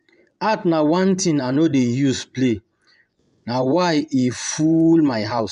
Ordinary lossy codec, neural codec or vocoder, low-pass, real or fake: none; vocoder, 24 kHz, 100 mel bands, Vocos; 10.8 kHz; fake